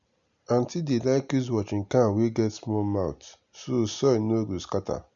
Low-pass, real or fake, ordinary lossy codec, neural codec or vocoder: 7.2 kHz; real; MP3, 96 kbps; none